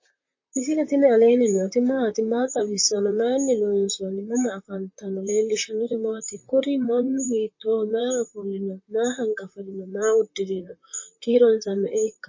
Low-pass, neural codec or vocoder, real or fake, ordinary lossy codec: 7.2 kHz; vocoder, 44.1 kHz, 128 mel bands, Pupu-Vocoder; fake; MP3, 32 kbps